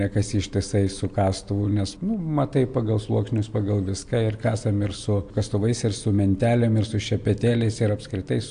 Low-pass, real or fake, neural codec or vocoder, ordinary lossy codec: 9.9 kHz; real; none; AAC, 64 kbps